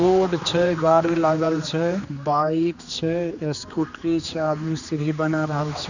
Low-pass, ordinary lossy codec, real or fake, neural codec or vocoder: 7.2 kHz; none; fake; codec, 16 kHz, 2 kbps, X-Codec, HuBERT features, trained on general audio